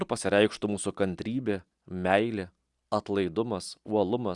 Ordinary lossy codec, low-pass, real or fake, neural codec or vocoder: Opus, 64 kbps; 10.8 kHz; real; none